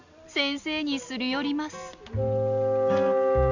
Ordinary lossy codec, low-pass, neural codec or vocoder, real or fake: Opus, 64 kbps; 7.2 kHz; none; real